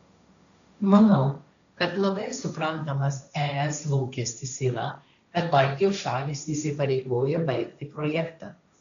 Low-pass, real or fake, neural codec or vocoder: 7.2 kHz; fake; codec, 16 kHz, 1.1 kbps, Voila-Tokenizer